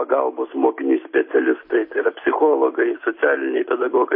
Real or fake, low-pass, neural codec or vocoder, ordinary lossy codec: real; 5.4 kHz; none; MP3, 24 kbps